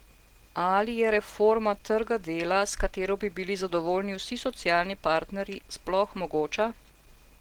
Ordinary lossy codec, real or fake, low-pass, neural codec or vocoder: Opus, 16 kbps; real; 19.8 kHz; none